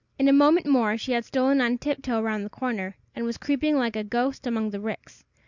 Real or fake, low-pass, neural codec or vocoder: real; 7.2 kHz; none